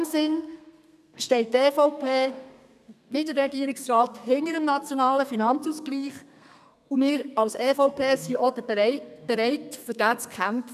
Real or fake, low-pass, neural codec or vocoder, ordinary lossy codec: fake; 14.4 kHz; codec, 32 kHz, 1.9 kbps, SNAC; none